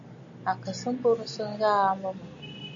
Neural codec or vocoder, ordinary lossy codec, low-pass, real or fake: none; MP3, 48 kbps; 7.2 kHz; real